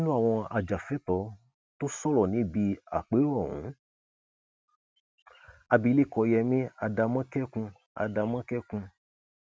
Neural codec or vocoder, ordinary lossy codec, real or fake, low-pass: none; none; real; none